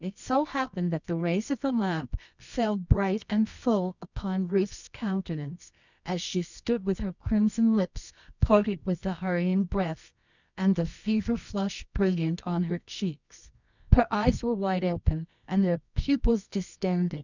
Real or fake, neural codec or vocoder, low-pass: fake; codec, 24 kHz, 0.9 kbps, WavTokenizer, medium music audio release; 7.2 kHz